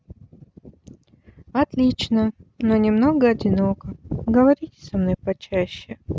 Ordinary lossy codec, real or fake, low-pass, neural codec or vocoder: none; real; none; none